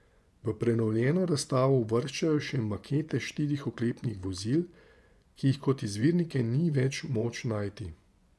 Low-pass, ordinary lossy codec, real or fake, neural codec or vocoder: none; none; fake; vocoder, 24 kHz, 100 mel bands, Vocos